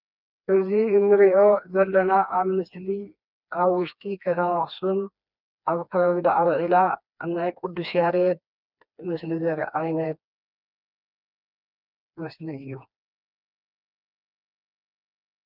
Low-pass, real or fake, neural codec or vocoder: 5.4 kHz; fake; codec, 16 kHz, 2 kbps, FreqCodec, smaller model